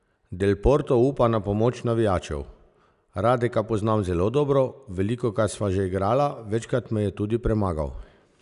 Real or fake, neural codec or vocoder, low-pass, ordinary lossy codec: real; none; 10.8 kHz; none